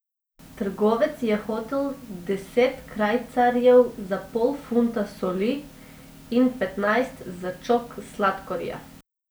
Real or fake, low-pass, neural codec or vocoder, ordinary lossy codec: real; none; none; none